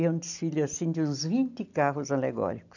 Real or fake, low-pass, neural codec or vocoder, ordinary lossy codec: real; 7.2 kHz; none; none